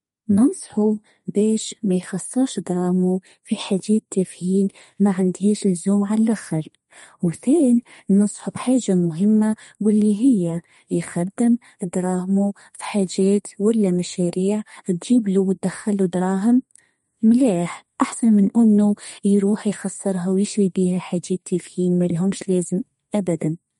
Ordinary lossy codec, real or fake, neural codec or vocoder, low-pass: MP3, 48 kbps; fake; codec, 32 kHz, 1.9 kbps, SNAC; 14.4 kHz